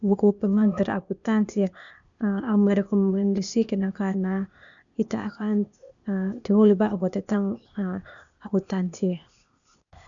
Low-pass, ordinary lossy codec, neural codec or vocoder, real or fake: 7.2 kHz; none; codec, 16 kHz, 0.8 kbps, ZipCodec; fake